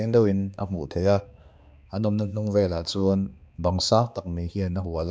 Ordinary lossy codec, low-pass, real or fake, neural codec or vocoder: none; none; fake; codec, 16 kHz, 2 kbps, X-Codec, HuBERT features, trained on balanced general audio